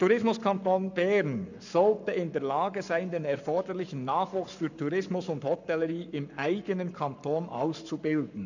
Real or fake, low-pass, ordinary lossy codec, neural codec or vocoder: fake; 7.2 kHz; none; codec, 44.1 kHz, 7.8 kbps, Pupu-Codec